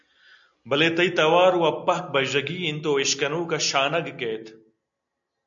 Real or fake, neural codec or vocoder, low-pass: real; none; 7.2 kHz